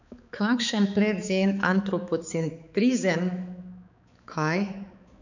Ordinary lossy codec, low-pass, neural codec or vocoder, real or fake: none; 7.2 kHz; codec, 16 kHz, 4 kbps, X-Codec, HuBERT features, trained on balanced general audio; fake